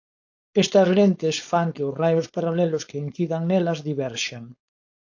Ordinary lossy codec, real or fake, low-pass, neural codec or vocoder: AAC, 48 kbps; fake; 7.2 kHz; codec, 16 kHz, 4.8 kbps, FACodec